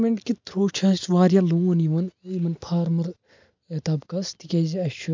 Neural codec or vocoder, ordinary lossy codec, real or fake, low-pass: none; MP3, 64 kbps; real; 7.2 kHz